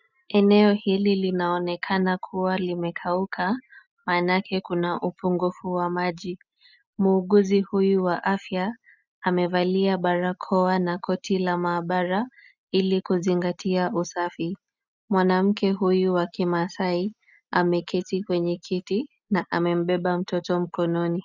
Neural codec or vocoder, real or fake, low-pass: none; real; 7.2 kHz